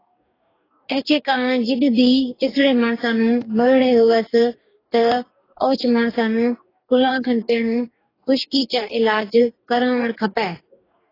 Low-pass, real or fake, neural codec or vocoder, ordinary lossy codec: 5.4 kHz; fake; codec, 44.1 kHz, 2.6 kbps, DAC; AAC, 24 kbps